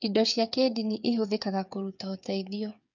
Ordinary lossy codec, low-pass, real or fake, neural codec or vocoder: none; 7.2 kHz; fake; codec, 16 kHz, 8 kbps, FreqCodec, smaller model